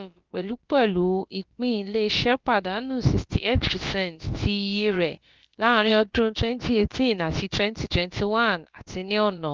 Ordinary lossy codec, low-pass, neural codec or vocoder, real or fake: Opus, 32 kbps; 7.2 kHz; codec, 16 kHz, about 1 kbps, DyCAST, with the encoder's durations; fake